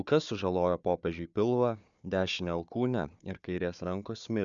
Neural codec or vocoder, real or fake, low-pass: codec, 16 kHz, 4 kbps, FunCodec, trained on Chinese and English, 50 frames a second; fake; 7.2 kHz